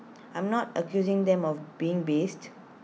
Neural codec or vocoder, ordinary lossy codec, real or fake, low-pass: none; none; real; none